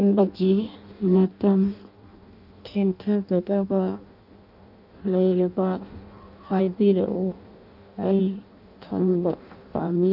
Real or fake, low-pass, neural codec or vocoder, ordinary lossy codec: fake; 5.4 kHz; codec, 16 kHz in and 24 kHz out, 0.6 kbps, FireRedTTS-2 codec; none